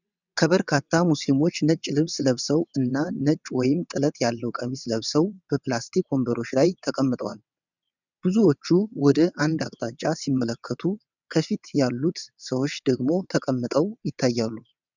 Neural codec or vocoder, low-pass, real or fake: vocoder, 22.05 kHz, 80 mel bands, WaveNeXt; 7.2 kHz; fake